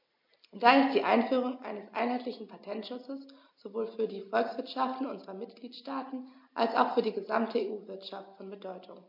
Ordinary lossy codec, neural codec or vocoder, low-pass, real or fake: MP3, 32 kbps; none; 5.4 kHz; real